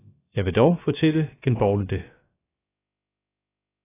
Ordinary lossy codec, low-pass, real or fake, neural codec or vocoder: AAC, 16 kbps; 3.6 kHz; fake; codec, 16 kHz, about 1 kbps, DyCAST, with the encoder's durations